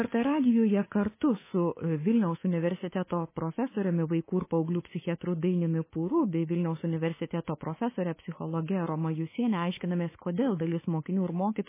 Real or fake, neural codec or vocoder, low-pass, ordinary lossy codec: fake; codec, 24 kHz, 3.1 kbps, DualCodec; 3.6 kHz; MP3, 16 kbps